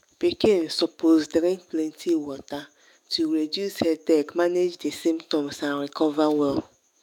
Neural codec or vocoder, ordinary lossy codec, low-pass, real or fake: autoencoder, 48 kHz, 128 numbers a frame, DAC-VAE, trained on Japanese speech; none; 19.8 kHz; fake